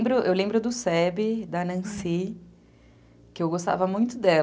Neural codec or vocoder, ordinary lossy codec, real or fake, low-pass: none; none; real; none